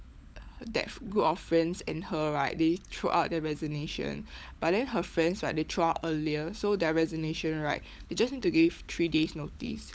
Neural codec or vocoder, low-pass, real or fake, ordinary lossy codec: codec, 16 kHz, 16 kbps, FunCodec, trained on LibriTTS, 50 frames a second; none; fake; none